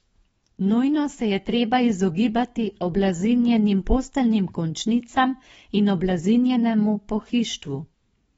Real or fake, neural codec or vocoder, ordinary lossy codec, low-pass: fake; codec, 44.1 kHz, 7.8 kbps, DAC; AAC, 24 kbps; 19.8 kHz